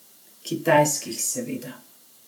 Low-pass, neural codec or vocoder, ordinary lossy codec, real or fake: none; vocoder, 44.1 kHz, 128 mel bands every 512 samples, BigVGAN v2; none; fake